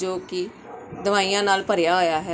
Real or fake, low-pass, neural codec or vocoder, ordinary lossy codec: real; none; none; none